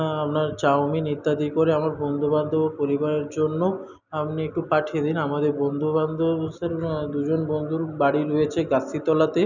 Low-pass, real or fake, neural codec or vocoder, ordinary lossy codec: 7.2 kHz; real; none; none